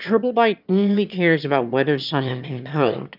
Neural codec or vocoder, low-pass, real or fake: autoencoder, 22.05 kHz, a latent of 192 numbers a frame, VITS, trained on one speaker; 5.4 kHz; fake